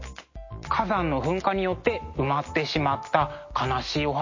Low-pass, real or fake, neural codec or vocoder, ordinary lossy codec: 7.2 kHz; real; none; MP3, 32 kbps